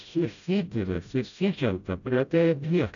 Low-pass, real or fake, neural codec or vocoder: 7.2 kHz; fake; codec, 16 kHz, 0.5 kbps, FreqCodec, smaller model